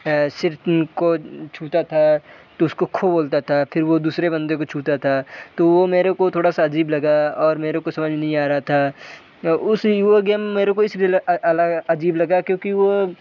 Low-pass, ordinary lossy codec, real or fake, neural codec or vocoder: 7.2 kHz; none; real; none